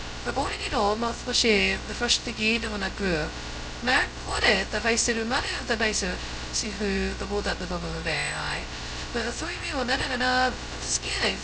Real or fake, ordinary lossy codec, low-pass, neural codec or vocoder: fake; none; none; codec, 16 kHz, 0.2 kbps, FocalCodec